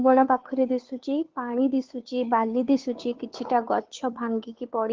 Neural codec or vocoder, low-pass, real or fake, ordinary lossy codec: codec, 16 kHz, 2 kbps, FunCodec, trained on Chinese and English, 25 frames a second; 7.2 kHz; fake; Opus, 16 kbps